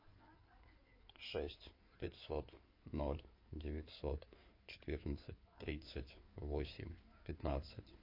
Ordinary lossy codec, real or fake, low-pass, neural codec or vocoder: MP3, 32 kbps; fake; 5.4 kHz; codec, 16 kHz in and 24 kHz out, 2.2 kbps, FireRedTTS-2 codec